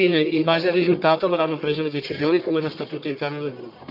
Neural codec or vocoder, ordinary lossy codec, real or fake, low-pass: codec, 44.1 kHz, 1.7 kbps, Pupu-Codec; none; fake; 5.4 kHz